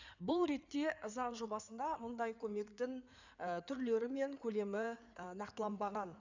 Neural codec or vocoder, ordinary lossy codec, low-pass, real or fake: codec, 16 kHz in and 24 kHz out, 2.2 kbps, FireRedTTS-2 codec; none; 7.2 kHz; fake